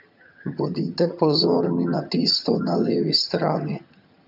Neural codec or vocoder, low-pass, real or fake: vocoder, 22.05 kHz, 80 mel bands, HiFi-GAN; 5.4 kHz; fake